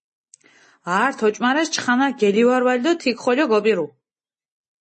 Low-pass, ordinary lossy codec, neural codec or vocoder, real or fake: 10.8 kHz; MP3, 32 kbps; none; real